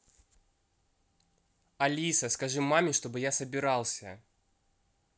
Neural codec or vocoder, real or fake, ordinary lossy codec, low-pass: none; real; none; none